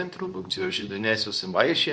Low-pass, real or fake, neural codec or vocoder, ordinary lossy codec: 9.9 kHz; fake; codec, 24 kHz, 0.9 kbps, WavTokenizer, medium speech release version 2; Opus, 64 kbps